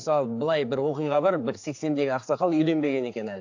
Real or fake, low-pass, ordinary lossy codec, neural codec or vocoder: fake; 7.2 kHz; AAC, 48 kbps; codec, 16 kHz, 4 kbps, X-Codec, HuBERT features, trained on general audio